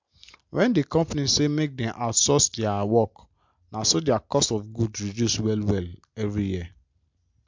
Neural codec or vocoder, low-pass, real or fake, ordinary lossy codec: none; 7.2 kHz; real; MP3, 64 kbps